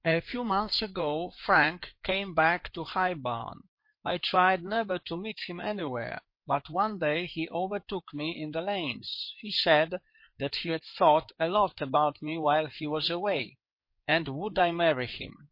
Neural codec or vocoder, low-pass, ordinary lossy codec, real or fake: codec, 16 kHz in and 24 kHz out, 2.2 kbps, FireRedTTS-2 codec; 5.4 kHz; MP3, 32 kbps; fake